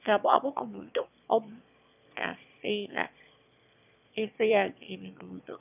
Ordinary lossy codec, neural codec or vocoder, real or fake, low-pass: none; autoencoder, 22.05 kHz, a latent of 192 numbers a frame, VITS, trained on one speaker; fake; 3.6 kHz